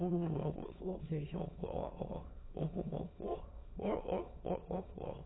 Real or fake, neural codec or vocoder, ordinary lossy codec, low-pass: fake; autoencoder, 22.05 kHz, a latent of 192 numbers a frame, VITS, trained on many speakers; AAC, 16 kbps; 7.2 kHz